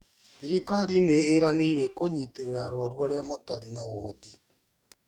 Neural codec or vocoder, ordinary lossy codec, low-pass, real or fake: codec, 44.1 kHz, 2.6 kbps, DAC; none; 19.8 kHz; fake